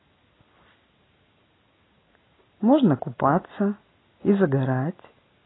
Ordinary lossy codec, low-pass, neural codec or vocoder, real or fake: AAC, 16 kbps; 7.2 kHz; none; real